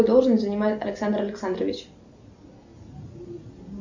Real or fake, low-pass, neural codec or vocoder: real; 7.2 kHz; none